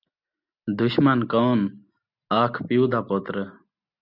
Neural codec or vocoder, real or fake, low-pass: none; real; 5.4 kHz